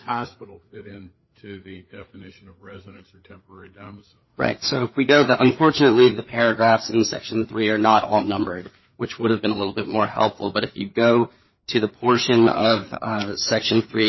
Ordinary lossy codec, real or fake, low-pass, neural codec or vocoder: MP3, 24 kbps; fake; 7.2 kHz; codec, 16 kHz, 4 kbps, FreqCodec, larger model